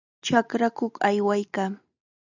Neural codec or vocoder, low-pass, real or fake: none; 7.2 kHz; real